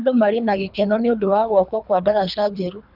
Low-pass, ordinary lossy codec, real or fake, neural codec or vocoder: 5.4 kHz; none; fake; codec, 24 kHz, 3 kbps, HILCodec